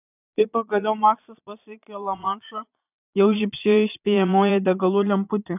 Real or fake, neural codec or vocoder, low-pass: fake; vocoder, 22.05 kHz, 80 mel bands, Vocos; 3.6 kHz